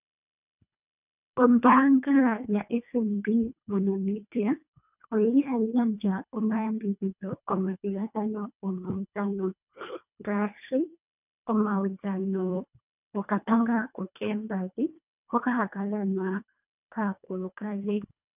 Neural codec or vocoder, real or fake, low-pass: codec, 24 kHz, 1.5 kbps, HILCodec; fake; 3.6 kHz